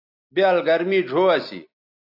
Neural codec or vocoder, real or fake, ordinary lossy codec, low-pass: none; real; AAC, 48 kbps; 5.4 kHz